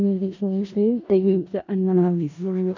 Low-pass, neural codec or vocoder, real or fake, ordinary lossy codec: 7.2 kHz; codec, 16 kHz in and 24 kHz out, 0.4 kbps, LongCat-Audio-Codec, four codebook decoder; fake; none